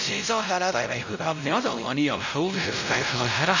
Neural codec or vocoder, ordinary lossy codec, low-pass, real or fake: codec, 16 kHz, 0.5 kbps, X-Codec, WavLM features, trained on Multilingual LibriSpeech; none; 7.2 kHz; fake